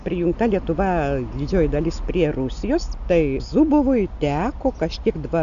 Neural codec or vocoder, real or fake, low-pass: none; real; 7.2 kHz